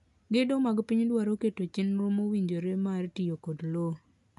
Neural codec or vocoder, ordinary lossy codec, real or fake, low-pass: none; none; real; 10.8 kHz